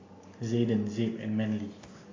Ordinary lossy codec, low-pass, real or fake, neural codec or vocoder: AAC, 32 kbps; 7.2 kHz; real; none